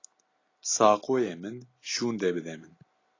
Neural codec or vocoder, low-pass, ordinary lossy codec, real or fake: none; 7.2 kHz; AAC, 32 kbps; real